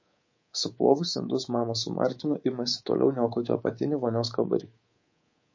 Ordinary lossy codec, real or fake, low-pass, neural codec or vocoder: MP3, 32 kbps; fake; 7.2 kHz; codec, 24 kHz, 3.1 kbps, DualCodec